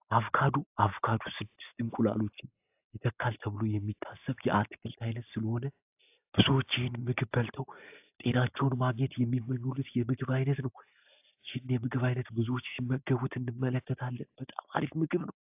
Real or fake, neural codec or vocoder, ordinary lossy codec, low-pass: real; none; AAC, 32 kbps; 3.6 kHz